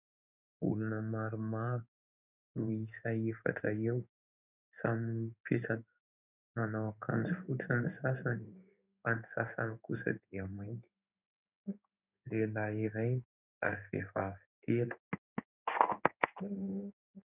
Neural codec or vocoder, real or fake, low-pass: codec, 16 kHz in and 24 kHz out, 1 kbps, XY-Tokenizer; fake; 3.6 kHz